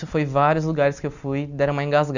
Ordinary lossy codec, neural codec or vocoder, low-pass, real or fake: none; none; 7.2 kHz; real